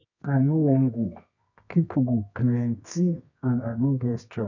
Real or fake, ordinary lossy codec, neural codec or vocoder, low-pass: fake; none; codec, 24 kHz, 0.9 kbps, WavTokenizer, medium music audio release; 7.2 kHz